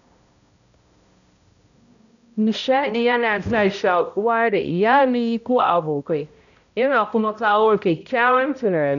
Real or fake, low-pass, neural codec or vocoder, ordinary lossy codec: fake; 7.2 kHz; codec, 16 kHz, 0.5 kbps, X-Codec, HuBERT features, trained on balanced general audio; none